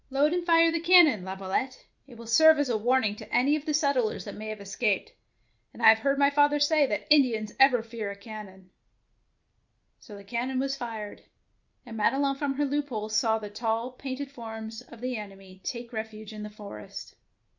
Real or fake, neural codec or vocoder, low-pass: real; none; 7.2 kHz